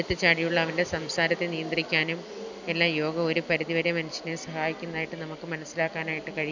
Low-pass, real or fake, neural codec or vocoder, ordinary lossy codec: 7.2 kHz; real; none; none